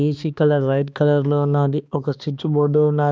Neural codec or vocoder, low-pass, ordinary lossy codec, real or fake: codec, 16 kHz, 2 kbps, X-Codec, HuBERT features, trained on balanced general audio; none; none; fake